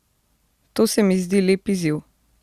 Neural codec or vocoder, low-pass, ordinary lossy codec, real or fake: none; 14.4 kHz; Opus, 64 kbps; real